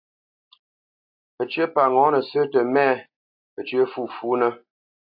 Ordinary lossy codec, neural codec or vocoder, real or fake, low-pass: AAC, 48 kbps; none; real; 5.4 kHz